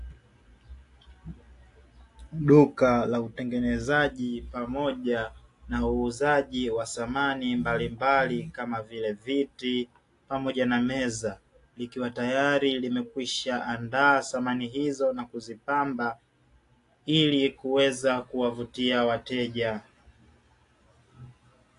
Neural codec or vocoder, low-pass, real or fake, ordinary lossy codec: none; 10.8 kHz; real; AAC, 48 kbps